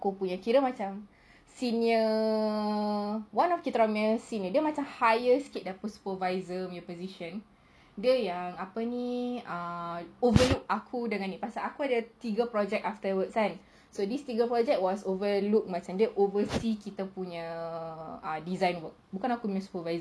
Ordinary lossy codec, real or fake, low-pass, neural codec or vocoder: none; real; none; none